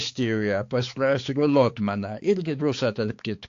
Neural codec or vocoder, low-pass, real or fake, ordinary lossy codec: codec, 16 kHz, 4 kbps, X-Codec, HuBERT features, trained on balanced general audio; 7.2 kHz; fake; AAC, 48 kbps